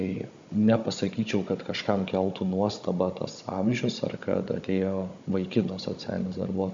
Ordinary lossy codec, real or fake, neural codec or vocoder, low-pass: MP3, 64 kbps; fake; codec, 16 kHz, 16 kbps, FunCodec, trained on Chinese and English, 50 frames a second; 7.2 kHz